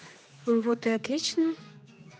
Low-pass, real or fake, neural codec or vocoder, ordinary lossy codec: none; fake; codec, 16 kHz, 2 kbps, X-Codec, HuBERT features, trained on general audio; none